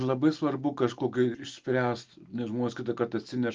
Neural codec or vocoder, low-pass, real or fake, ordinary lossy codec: none; 7.2 kHz; real; Opus, 32 kbps